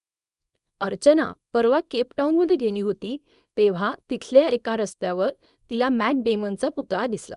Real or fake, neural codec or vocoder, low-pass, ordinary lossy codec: fake; codec, 24 kHz, 0.9 kbps, WavTokenizer, small release; 10.8 kHz; none